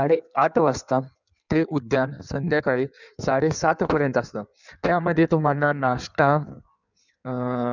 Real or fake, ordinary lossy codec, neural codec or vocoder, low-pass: fake; none; codec, 16 kHz in and 24 kHz out, 1.1 kbps, FireRedTTS-2 codec; 7.2 kHz